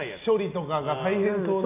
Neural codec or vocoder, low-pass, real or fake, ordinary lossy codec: none; 3.6 kHz; real; none